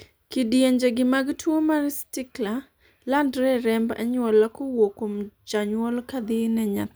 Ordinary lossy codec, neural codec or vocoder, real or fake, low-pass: none; none; real; none